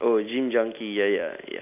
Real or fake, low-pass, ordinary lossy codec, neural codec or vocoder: real; 3.6 kHz; none; none